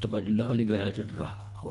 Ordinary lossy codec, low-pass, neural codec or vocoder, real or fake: none; 10.8 kHz; codec, 24 kHz, 1.5 kbps, HILCodec; fake